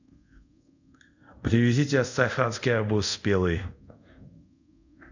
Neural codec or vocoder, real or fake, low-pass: codec, 24 kHz, 0.5 kbps, DualCodec; fake; 7.2 kHz